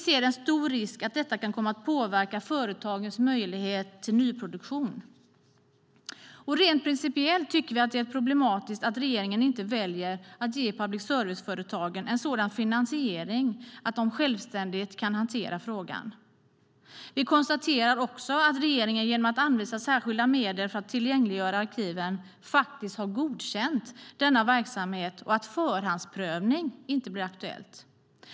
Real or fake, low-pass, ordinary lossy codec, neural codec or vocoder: real; none; none; none